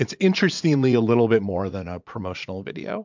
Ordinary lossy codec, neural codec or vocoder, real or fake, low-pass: MP3, 64 kbps; vocoder, 44.1 kHz, 80 mel bands, Vocos; fake; 7.2 kHz